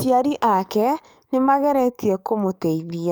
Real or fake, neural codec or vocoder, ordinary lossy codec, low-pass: fake; codec, 44.1 kHz, 7.8 kbps, DAC; none; none